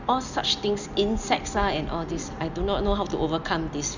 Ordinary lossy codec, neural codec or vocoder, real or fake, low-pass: none; none; real; 7.2 kHz